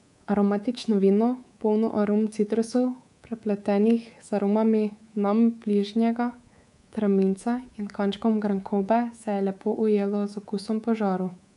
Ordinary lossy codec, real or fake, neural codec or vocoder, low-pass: none; fake; codec, 24 kHz, 3.1 kbps, DualCodec; 10.8 kHz